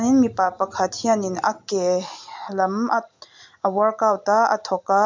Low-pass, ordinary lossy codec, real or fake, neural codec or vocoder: 7.2 kHz; MP3, 64 kbps; real; none